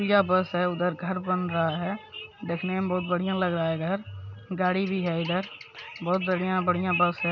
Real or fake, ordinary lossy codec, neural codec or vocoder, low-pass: real; none; none; 7.2 kHz